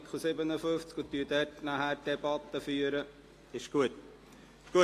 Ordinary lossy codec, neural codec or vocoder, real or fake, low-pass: AAC, 48 kbps; none; real; 14.4 kHz